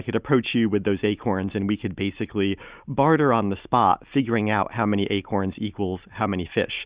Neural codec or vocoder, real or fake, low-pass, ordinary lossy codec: none; real; 3.6 kHz; Opus, 64 kbps